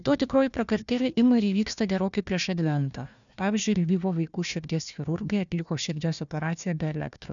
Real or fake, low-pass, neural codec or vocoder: fake; 7.2 kHz; codec, 16 kHz, 1 kbps, FunCodec, trained on Chinese and English, 50 frames a second